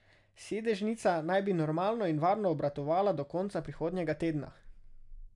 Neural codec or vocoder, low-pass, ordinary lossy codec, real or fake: none; 10.8 kHz; none; real